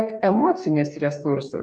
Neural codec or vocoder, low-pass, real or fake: codec, 44.1 kHz, 2.6 kbps, DAC; 9.9 kHz; fake